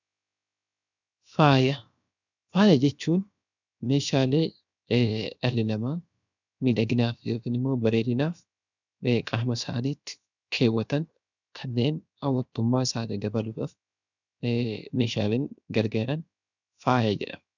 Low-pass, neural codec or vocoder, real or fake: 7.2 kHz; codec, 16 kHz, 0.7 kbps, FocalCodec; fake